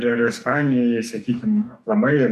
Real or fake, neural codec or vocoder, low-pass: fake; codec, 44.1 kHz, 2.6 kbps, DAC; 14.4 kHz